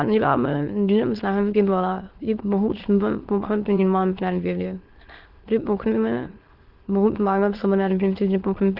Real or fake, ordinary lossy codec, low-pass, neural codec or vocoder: fake; Opus, 16 kbps; 5.4 kHz; autoencoder, 22.05 kHz, a latent of 192 numbers a frame, VITS, trained on many speakers